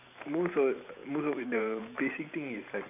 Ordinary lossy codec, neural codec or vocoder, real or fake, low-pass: none; vocoder, 44.1 kHz, 128 mel bands every 512 samples, BigVGAN v2; fake; 3.6 kHz